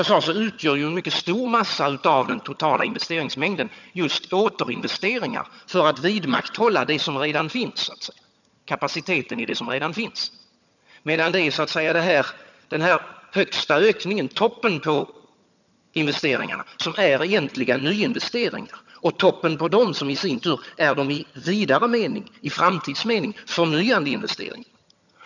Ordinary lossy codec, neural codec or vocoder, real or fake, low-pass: none; vocoder, 22.05 kHz, 80 mel bands, HiFi-GAN; fake; 7.2 kHz